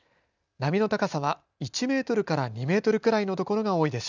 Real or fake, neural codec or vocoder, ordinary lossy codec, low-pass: real; none; none; 7.2 kHz